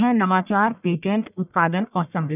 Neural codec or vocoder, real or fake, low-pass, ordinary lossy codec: codec, 44.1 kHz, 1.7 kbps, Pupu-Codec; fake; 3.6 kHz; none